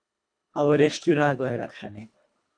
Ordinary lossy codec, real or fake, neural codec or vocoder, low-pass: AAC, 64 kbps; fake; codec, 24 kHz, 1.5 kbps, HILCodec; 9.9 kHz